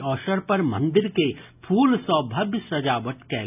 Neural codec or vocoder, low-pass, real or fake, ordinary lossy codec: none; 3.6 kHz; real; none